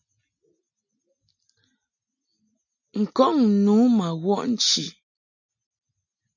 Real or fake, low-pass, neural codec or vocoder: real; 7.2 kHz; none